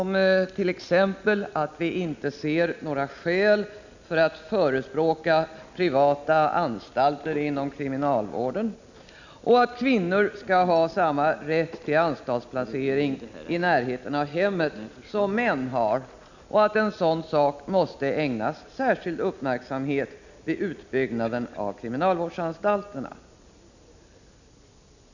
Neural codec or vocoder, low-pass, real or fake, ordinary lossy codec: none; 7.2 kHz; real; none